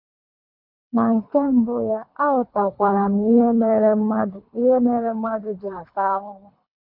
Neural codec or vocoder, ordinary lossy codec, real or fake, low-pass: codec, 24 kHz, 3 kbps, HILCodec; none; fake; 5.4 kHz